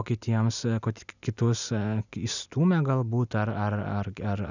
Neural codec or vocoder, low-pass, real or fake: none; 7.2 kHz; real